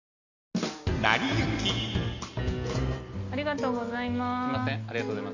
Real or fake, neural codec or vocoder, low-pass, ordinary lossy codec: real; none; 7.2 kHz; none